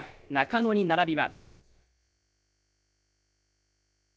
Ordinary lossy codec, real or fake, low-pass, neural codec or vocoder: none; fake; none; codec, 16 kHz, about 1 kbps, DyCAST, with the encoder's durations